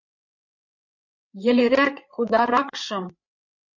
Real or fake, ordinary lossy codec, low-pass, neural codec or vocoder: fake; MP3, 48 kbps; 7.2 kHz; codec, 16 kHz, 8 kbps, FreqCodec, larger model